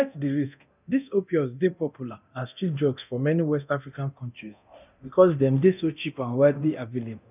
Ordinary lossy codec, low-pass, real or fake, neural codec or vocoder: none; 3.6 kHz; fake; codec, 24 kHz, 0.9 kbps, DualCodec